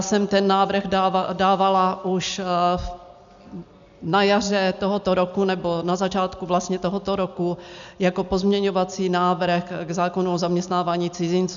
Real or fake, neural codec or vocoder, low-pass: real; none; 7.2 kHz